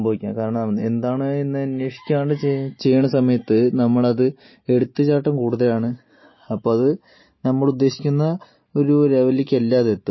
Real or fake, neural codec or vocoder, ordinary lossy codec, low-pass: real; none; MP3, 24 kbps; 7.2 kHz